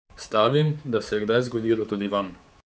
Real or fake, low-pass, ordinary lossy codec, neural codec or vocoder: fake; none; none; codec, 16 kHz, 4 kbps, X-Codec, HuBERT features, trained on general audio